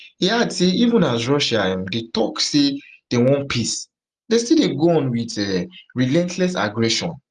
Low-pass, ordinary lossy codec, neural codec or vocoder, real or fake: 10.8 kHz; Opus, 32 kbps; none; real